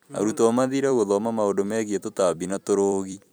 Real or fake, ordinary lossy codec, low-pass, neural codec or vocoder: fake; none; none; vocoder, 44.1 kHz, 128 mel bands every 256 samples, BigVGAN v2